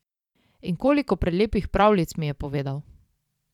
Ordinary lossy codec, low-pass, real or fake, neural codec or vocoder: none; 19.8 kHz; fake; vocoder, 44.1 kHz, 128 mel bands every 512 samples, BigVGAN v2